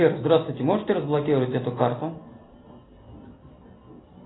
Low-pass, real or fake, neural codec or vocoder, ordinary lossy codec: 7.2 kHz; real; none; AAC, 16 kbps